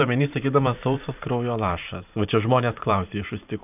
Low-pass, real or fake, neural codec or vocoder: 3.6 kHz; fake; vocoder, 44.1 kHz, 128 mel bands every 512 samples, BigVGAN v2